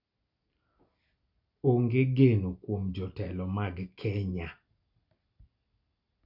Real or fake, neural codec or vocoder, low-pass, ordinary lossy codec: real; none; 5.4 kHz; none